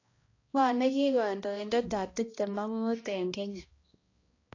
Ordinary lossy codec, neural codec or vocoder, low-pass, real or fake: AAC, 32 kbps; codec, 16 kHz, 1 kbps, X-Codec, HuBERT features, trained on balanced general audio; 7.2 kHz; fake